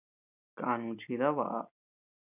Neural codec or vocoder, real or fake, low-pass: none; real; 3.6 kHz